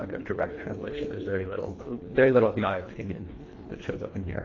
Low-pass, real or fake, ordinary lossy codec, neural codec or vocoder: 7.2 kHz; fake; AAC, 32 kbps; codec, 24 kHz, 1.5 kbps, HILCodec